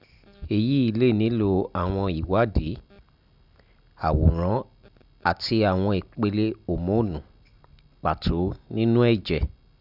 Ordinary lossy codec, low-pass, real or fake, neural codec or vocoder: none; 5.4 kHz; real; none